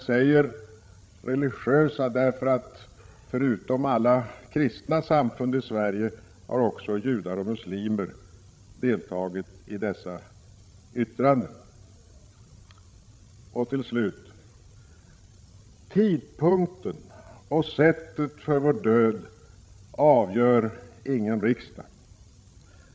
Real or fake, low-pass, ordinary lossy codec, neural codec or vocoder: fake; none; none; codec, 16 kHz, 16 kbps, FreqCodec, larger model